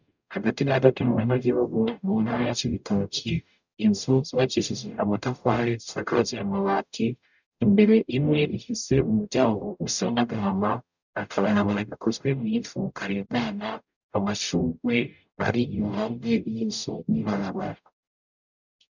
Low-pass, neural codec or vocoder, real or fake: 7.2 kHz; codec, 44.1 kHz, 0.9 kbps, DAC; fake